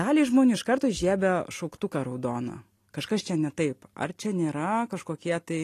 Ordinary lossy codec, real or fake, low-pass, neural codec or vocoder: AAC, 48 kbps; real; 14.4 kHz; none